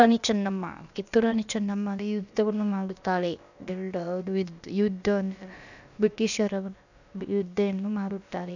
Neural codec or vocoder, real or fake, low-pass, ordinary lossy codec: codec, 16 kHz, about 1 kbps, DyCAST, with the encoder's durations; fake; 7.2 kHz; none